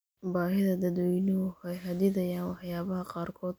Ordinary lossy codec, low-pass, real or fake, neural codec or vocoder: none; none; real; none